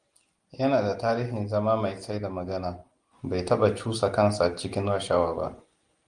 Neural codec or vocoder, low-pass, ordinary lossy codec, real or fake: none; 9.9 kHz; Opus, 24 kbps; real